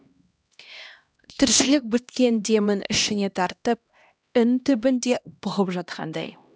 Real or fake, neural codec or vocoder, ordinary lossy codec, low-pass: fake; codec, 16 kHz, 1 kbps, X-Codec, HuBERT features, trained on LibriSpeech; none; none